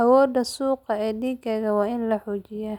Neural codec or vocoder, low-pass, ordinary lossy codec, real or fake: none; 19.8 kHz; none; real